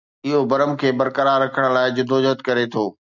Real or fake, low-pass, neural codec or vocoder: real; 7.2 kHz; none